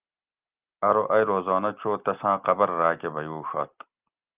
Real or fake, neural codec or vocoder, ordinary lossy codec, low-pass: real; none; Opus, 24 kbps; 3.6 kHz